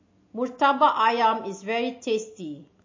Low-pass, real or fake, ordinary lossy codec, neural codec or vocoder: 7.2 kHz; real; MP3, 32 kbps; none